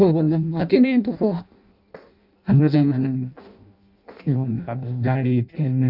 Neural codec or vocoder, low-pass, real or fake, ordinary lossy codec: codec, 16 kHz in and 24 kHz out, 0.6 kbps, FireRedTTS-2 codec; 5.4 kHz; fake; none